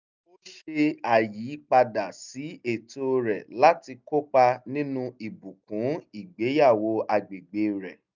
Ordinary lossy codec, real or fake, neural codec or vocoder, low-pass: none; real; none; 7.2 kHz